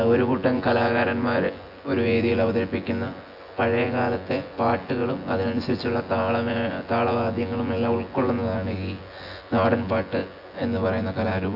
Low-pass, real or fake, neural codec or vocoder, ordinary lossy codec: 5.4 kHz; fake; vocoder, 24 kHz, 100 mel bands, Vocos; AAC, 48 kbps